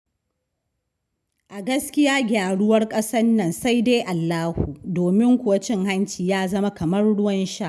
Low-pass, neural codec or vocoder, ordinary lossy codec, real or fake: none; none; none; real